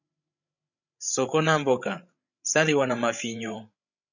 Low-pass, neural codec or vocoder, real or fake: 7.2 kHz; codec, 16 kHz, 16 kbps, FreqCodec, larger model; fake